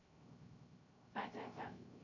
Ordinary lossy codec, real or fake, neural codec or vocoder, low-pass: none; fake; codec, 16 kHz, 0.3 kbps, FocalCodec; 7.2 kHz